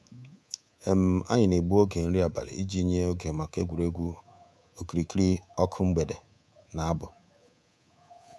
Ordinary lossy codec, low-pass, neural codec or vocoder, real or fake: none; none; codec, 24 kHz, 3.1 kbps, DualCodec; fake